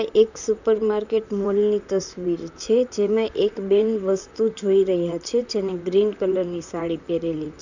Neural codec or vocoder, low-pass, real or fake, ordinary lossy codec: vocoder, 44.1 kHz, 128 mel bands, Pupu-Vocoder; 7.2 kHz; fake; none